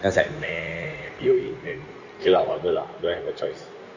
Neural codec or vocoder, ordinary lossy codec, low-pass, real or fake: codec, 16 kHz in and 24 kHz out, 2.2 kbps, FireRedTTS-2 codec; none; 7.2 kHz; fake